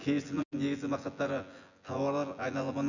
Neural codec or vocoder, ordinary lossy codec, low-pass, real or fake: vocoder, 24 kHz, 100 mel bands, Vocos; none; 7.2 kHz; fake